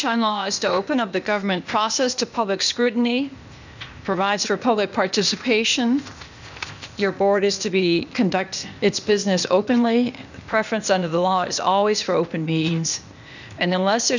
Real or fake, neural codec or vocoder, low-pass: fake; codec, 16 kHz, 0.8 kbps, ZipCodec; 7.2 kHz